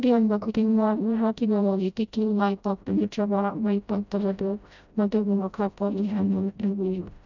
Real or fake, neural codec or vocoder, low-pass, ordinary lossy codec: fake; codec, 16 kHz, 0.5 kbps, FreqCodec, smaller model; 7.2 kHz; none